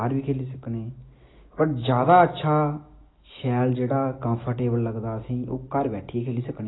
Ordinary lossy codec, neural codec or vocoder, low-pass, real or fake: AAC, 16 kbps; none; 7.2 kHz; real